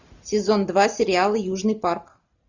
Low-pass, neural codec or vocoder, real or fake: 7.2 kHz; none; real